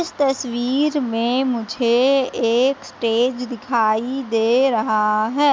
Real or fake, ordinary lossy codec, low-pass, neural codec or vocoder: real; none; none; none